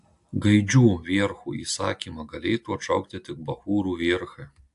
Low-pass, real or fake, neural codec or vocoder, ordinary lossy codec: 10.8 kHz; real; none; AAC, 64 kbps